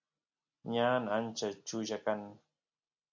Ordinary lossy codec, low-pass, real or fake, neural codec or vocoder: MP3, 64 kbps; 7.2 kHz; real; none